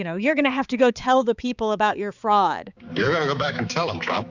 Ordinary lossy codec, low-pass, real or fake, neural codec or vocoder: Opus, 64 kbps; 7.2 kHz; fake; codec, 16 kHz, 4 kbps, X-Codec, HuBERT features, trained on balanced general audio